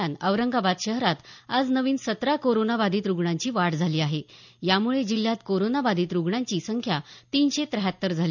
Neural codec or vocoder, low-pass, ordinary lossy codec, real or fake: none; 7.2 kHz; none; real